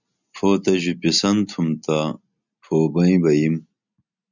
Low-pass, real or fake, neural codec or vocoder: 7.2 kHz; real; none